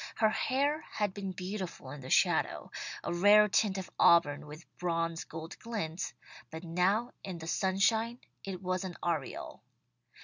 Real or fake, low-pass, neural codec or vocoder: real; 7.2 kHz; none